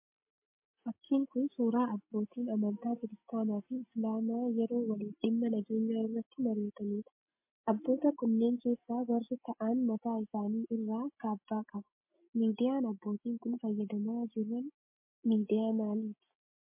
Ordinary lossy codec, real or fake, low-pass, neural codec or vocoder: MP3, 24 kbps; real; 3.6 kHz; none